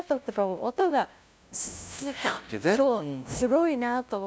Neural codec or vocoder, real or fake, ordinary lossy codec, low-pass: codec, 16 kHz, 0.5 kbps, FunCodec, trained on LibriTTS, 25 frames a second; fake; none; none